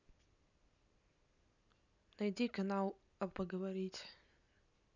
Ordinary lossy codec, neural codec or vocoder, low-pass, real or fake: none; none; 7.2 kHz; real